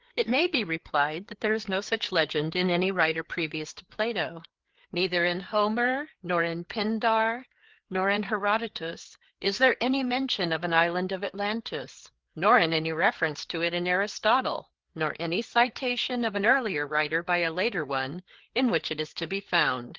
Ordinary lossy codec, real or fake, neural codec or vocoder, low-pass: Opus, 16 kbps; fake; codec, 16 kHz, 4 kbps, FreqCodec, larger model; 7.2 kHz